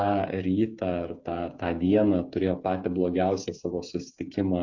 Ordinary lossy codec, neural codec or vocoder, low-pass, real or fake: AAC, 48 kbps; codec, 16 kHz, 8 kbps, FreqCodec, smaller model; 7.2 kHz; fake